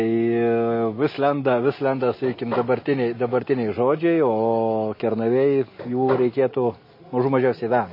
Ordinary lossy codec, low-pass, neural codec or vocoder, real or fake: MP3, 24 kbps; 5.4 kHz; none; real